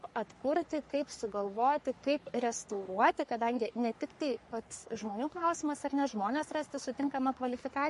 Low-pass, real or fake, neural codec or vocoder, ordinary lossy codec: 14.4 kHz; fake; codec, 44.1 kHz, 3.4 kbps, Pupu-Codec; MP3, 48 kbps